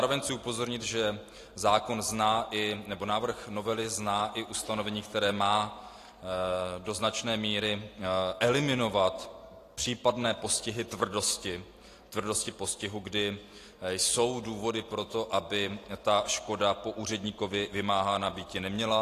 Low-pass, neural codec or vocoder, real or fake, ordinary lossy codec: 14.4 kHz; none; real; AAC, 48 kbps